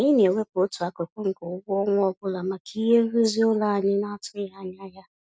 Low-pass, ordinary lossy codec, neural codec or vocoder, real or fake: none; none; none; real